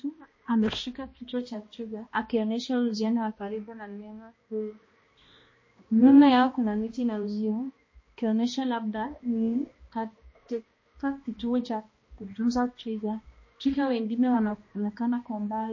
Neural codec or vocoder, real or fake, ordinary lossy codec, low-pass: codec, 16 kHz, 1 kbps, X-Codec, HuBERT features, trained on balanced general audio; fake; MP3, 32 kbps; 7.2 kHz